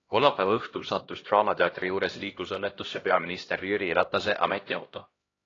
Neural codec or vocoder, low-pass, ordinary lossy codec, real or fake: codec, 16 kHz, 1 kbps, X-Codec, HuBERT features, trained on LibriSpeech; 7.2 kHz; AAC, 32 kbps; fake